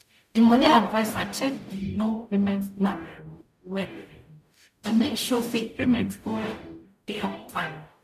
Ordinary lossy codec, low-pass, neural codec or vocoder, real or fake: none; 14.4 kHz; codec, 44.1 kHz, 0.9 kbps, DAC; fake